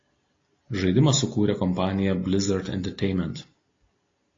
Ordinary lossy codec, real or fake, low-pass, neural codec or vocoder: AAC, 32 kbps; real; 7.2 kHz; none